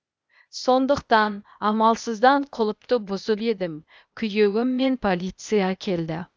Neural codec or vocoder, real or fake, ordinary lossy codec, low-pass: codec, 16 kHz, 0.8 kbps, ZipCodec; fake; none; none